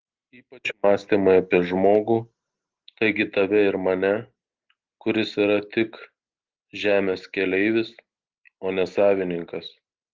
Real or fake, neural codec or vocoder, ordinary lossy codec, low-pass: real; none; Opus, 16 kbps; 7.2 kHz